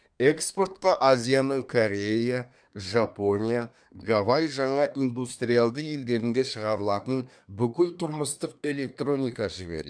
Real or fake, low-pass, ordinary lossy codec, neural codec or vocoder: fake; 9.9 kHz; none; codec, 24 kHz, 1 kbps, SNAC